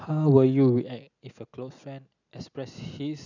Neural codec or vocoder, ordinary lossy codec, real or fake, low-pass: none; none; real; 7.2 kHz